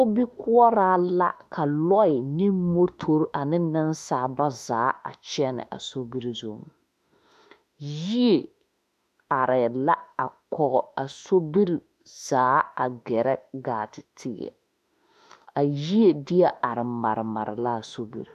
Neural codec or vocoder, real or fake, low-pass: autoencoder, 48 kHz, 32 numbers a frame, DAC-VAE, trained on Japanese speech; fake; 14.4 kHz